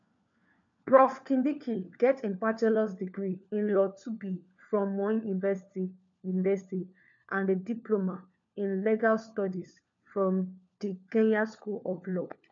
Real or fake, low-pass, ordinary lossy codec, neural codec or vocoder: fake; 7.2 kHz; MP3, 64 kbps; codec, 16 kHz, 4 kbps, FunCodec, trained on LibriTTS, 50 frames a second